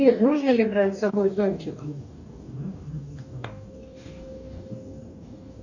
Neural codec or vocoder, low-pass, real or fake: codec, 44.1 kHz, 2.6 kbps, DAC; 7.2 kHz; fake